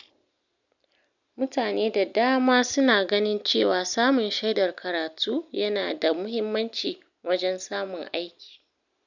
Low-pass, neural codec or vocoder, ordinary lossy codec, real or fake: 7.2 kHz; none; none; real